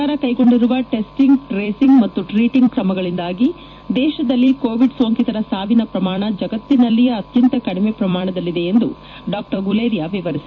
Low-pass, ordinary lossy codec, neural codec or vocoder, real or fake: 7.2 kHz; AAC, 48 kbps; vocoder, 44.1 kHz, 128 mel bands every 256 samples, BigVGAN v2; fake